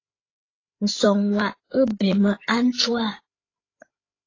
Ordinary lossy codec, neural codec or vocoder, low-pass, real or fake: AAC, 32 kbps; codec, 16 kHz, 8 kbps, FreqCodec, larger model; 7.2 kHz; fake